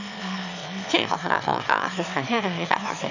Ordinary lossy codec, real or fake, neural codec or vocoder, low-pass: none; fake; autoencoder, 22.05 kHz, a latent of 192 numbers a frame, VITS, trained on one speaker; 7.2 kHz